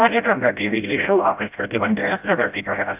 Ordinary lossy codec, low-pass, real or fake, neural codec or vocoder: AAC, 32 kbps; 3.6 kHz; fake; codec, 16 kHz, 0.5 kbps, FreqCodec, smaller model